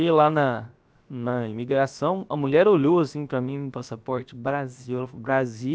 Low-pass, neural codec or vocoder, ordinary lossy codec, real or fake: none; codec, 16 kHz, 0.7 kbps, FocalCodec; none; fake